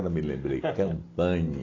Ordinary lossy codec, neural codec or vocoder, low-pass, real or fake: AAC, 32 kbps; none; 7.2 kHz; real